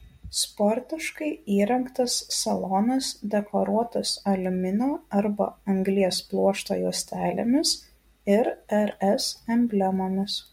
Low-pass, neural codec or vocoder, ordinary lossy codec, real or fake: 19.8 kHz; none; MP3, 64 kbps; real